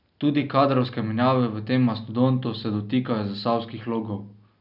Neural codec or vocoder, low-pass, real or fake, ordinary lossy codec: none; 5.4 kHz; real; none